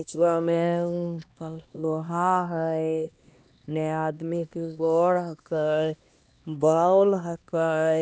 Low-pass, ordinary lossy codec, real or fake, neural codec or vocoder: none; none; fake; codec, 16 kHz, 1 kbps, X-Codec, HuBERT features, trained on LibriSpeech